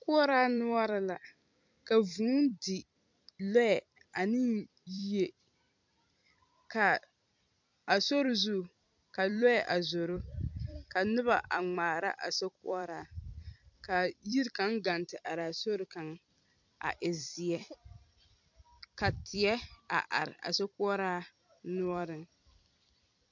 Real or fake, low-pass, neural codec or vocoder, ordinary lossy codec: fake; 7.2 kHz; vocoder, 44.1 kHz, 128 mel bands every 256 samples, BigVGAN v2; MP3, 64 kbps